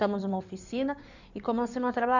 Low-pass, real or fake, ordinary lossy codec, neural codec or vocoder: 7.2 kHz; fake; none; codec, 16 kHz in and 24 kHz out, 2.2 kbps, FireRedTTS-2 codec